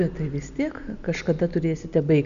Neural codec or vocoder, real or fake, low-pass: none; real; 7.2 kHz